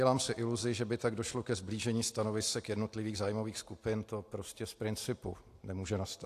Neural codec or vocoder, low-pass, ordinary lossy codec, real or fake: none; 14.4 kHz; AAC, 64 kbps; real